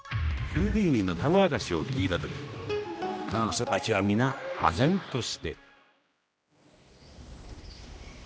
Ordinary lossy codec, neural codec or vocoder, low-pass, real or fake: none; codec, 16 kHz, 1 kbps, X-Codec, HuBERT features, trained on general audio; none; fake